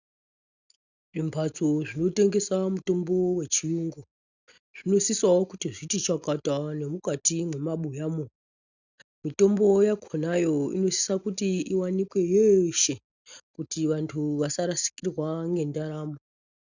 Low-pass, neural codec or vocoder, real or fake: 7.2 kHz; none; real